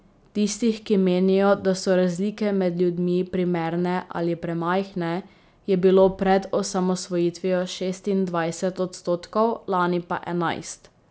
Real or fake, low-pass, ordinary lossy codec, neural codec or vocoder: real; none; none; none